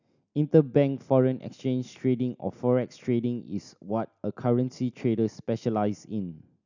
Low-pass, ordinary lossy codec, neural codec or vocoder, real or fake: 7.2 kHz; none; none; real